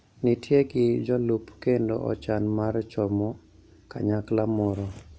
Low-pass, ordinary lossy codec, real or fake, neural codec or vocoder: none; none; real; none